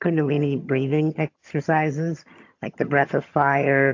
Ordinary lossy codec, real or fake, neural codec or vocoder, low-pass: AAC, 48 kbps; fake; vocoder, 22.05 kHz, 80 mel bands, HiFi-GAN; 7.2 kHz